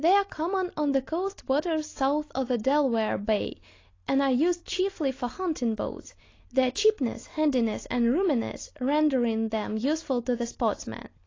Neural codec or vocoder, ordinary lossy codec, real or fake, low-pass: none; AAC, 32 kbps; real; 7.2 kHz